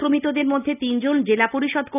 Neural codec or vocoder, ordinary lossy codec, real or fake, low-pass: none; none; real; 3.6 kHz